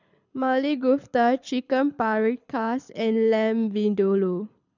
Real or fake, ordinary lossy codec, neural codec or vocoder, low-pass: fake; none; codec, 24 kHz, 6 kbps, HILCodec; 7.2 kHz